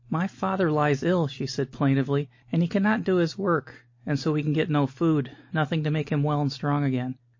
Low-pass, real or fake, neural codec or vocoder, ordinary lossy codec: 7.2 kHz; real; none; MP3, 32 kbps